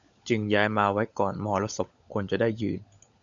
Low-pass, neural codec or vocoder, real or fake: 7.2 kHz; codec, 16 kHz, 16 kbps, FunCodec, trained on LibriTTS, 50 frames a second; fake